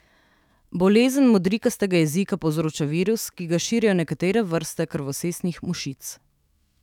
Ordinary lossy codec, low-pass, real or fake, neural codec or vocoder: none; 19.8 kHz; fake; autoencoder, 48 kHz, 128 numbers a frame, DAC-VAE, trained on Japanese speech